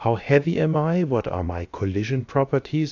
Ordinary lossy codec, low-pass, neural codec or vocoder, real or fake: AAC, 48 kbps; 7.2 kHz; codec, 16 kHz, about 1 kbps, DyCAST, with the encoder's durations; fake